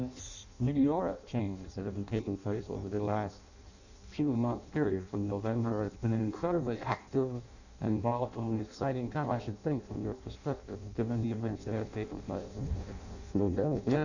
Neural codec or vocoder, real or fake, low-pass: codec, 16 kHz in and 24 kHz out, 0.6 kbps, FireRedTTS-2 codec; fake; 7.2 kHz